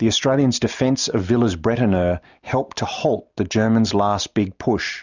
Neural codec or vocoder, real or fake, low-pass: none; real; 7.2 kHz